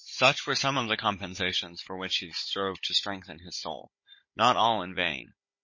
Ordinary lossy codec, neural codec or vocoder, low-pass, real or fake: MP3, 32 kbps; codec, 16 kHz, 16 kbps, FunCodec, trained on Chinese and English, 50 frames a second; 7.2 kHz; fake